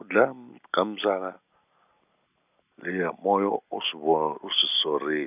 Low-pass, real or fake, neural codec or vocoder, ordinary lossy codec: 3.6 kHz; real; none; none